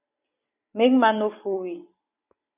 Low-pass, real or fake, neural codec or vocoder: 3.6 kHz; fake; vocoder, 44.1 kHz, 128 mel bands every 256 samples, BigVGAN v2